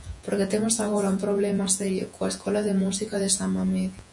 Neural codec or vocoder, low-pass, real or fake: vocoder, 48 kHz, 128 mel bands, Vocos; 10.8 kHz; fake